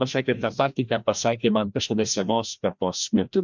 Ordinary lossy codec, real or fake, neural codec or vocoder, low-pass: MP3, 64 kbps; fake; codec, 16 kHz, 1 kbps, FreqCodec, larger model; 7.2 kHz